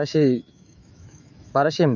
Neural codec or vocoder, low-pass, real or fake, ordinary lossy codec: autoencoder, 48 kHz, 128 numbers a frame, DAC-VAE, trained on Japanese speech; 7.2 kHz; fake; none